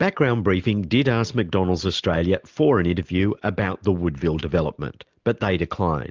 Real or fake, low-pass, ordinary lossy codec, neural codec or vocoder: real; 7.2 kHz; Opus, 24 kbps; none